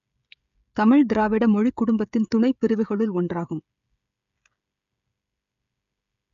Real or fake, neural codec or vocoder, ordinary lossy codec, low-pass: fake; codec, 16 kHz, 16 kbps, FreqCodec, smaller model; none; 7.2 kHz